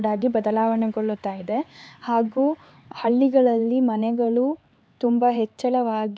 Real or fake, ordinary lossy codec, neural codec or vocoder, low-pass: fake; none; codec, 16 kHz, 4 kbps, X-Codec, HuBERT features, trained on LibriSpeech; none